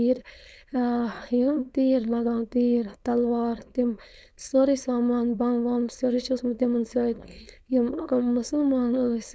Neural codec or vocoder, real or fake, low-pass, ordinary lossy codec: codec, 16 kHz, 4.8 kbps, FACodec; fake; none; none